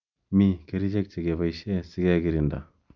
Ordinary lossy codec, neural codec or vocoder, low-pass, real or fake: none; none; 7.2 kHz; real